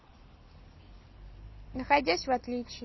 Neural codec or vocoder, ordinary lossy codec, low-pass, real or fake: none; MP3, 24 kbps; 7.2 kHz; real